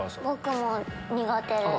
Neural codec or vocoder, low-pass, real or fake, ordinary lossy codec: none; none; real; none